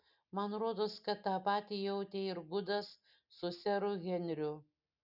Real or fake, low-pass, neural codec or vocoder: real; 5.4 kHz; none